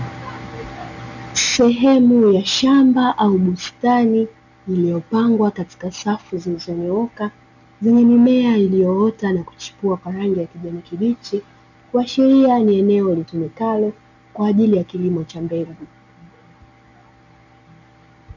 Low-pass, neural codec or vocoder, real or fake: 7.2 kHz; none; real